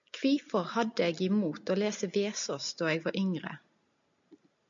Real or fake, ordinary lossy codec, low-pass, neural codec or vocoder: real; MP3, 64 kbps; 7.2 kHz; none